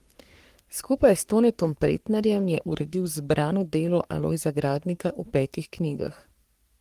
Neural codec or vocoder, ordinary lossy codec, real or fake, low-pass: codec, 44.1 kHz, 3.4 kbps, Pupu-Codec; Opus, 24 kbps; fake; 14.4 kHz